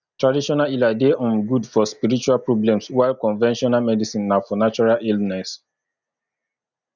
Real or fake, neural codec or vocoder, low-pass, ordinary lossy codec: real; none; 7.2 kHz; none